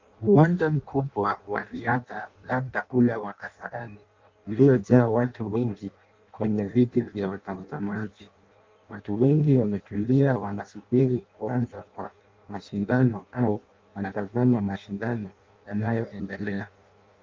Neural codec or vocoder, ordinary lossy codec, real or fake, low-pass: codec, 16 kHz in and 24 kHz out, 0.6 kbps, FireRedTTS-2 codec; Opus, 24 kbps; fake; 7.2 kHz